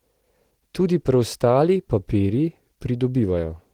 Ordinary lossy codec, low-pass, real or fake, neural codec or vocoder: Opus, 16 kbps; 19.8 kHz; real; none